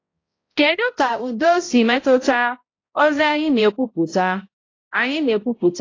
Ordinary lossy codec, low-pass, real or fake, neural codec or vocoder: AAC, 32 kbps; 7.2 kHz; fake; codec, 16 kHz, 0.5 kbps, X-Codec, HuBERT features, trained on balanced general audio